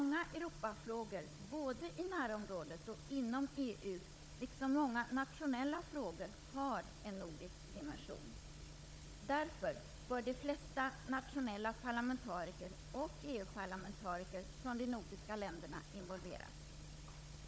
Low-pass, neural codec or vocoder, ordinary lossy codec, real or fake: none; codec, 16 kHz, 16 kbps, FunCodec, trained on Chinese and English, 50 frames a second; none; fake